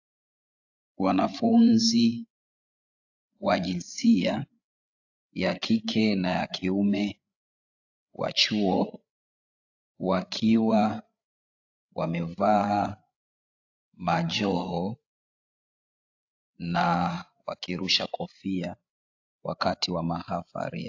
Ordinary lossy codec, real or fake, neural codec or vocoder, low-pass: AAC, 48 kbps; fake; codec, 16 kHz, 8 kbps, FreqCodec, larger model; 7.2 kHz